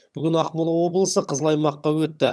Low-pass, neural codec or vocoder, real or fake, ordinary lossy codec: none; vocoder, 22.05 kHz, 80 mel bands, HiFi-GAN; fake; none